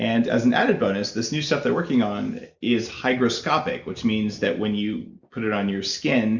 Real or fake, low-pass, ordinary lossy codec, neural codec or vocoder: real; 7.2 kHz; Opus, 64 kbps; none